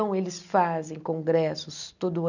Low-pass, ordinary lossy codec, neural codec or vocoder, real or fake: 7.2 kHz; none; none; real